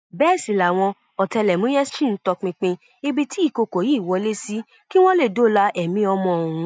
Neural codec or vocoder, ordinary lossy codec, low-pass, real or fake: none; none; none; real